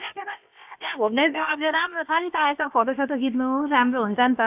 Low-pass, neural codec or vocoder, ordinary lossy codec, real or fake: 3.6 kHz; codec, 16 kHz, about 1 kbps, DyCAST, with the encoder's durations; AAC, 32 kbps; fake